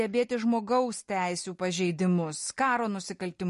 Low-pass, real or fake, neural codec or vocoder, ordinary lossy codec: 14.4 kHz; real; none; MP3, 48 kbps